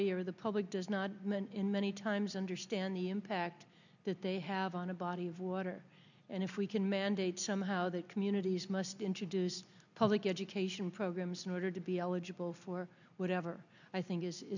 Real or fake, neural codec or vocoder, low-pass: real; none; 7.2 kHz